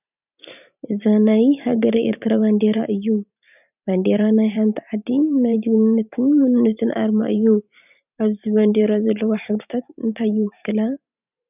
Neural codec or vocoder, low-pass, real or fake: vocoder, 44.1 kHz, 128 mel bands every 512 samples, BigVGAN v2; 3.6 kHz; fake